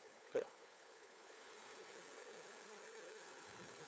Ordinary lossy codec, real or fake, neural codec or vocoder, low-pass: none; fake; codec, 16 kHz, 4 kbps, FunCodec, trained on LibriTTS, 50 frames a second; none